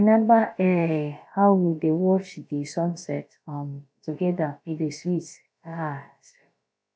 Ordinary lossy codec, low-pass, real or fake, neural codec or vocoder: none; none; fake; codec, 16 kHz, about 1 kbps, DyCAST, with the encoder's durations